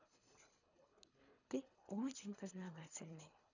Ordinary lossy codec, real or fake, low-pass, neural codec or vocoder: none; fake; 7.2 kHz; codec, 24 kHz, 3 kbps, HILCodec